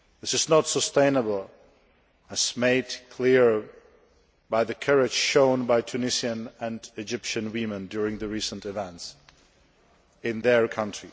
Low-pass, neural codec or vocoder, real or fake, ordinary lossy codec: none; none; real; none